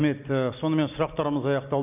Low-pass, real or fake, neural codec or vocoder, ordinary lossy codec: 3.6 kHz; real; none; none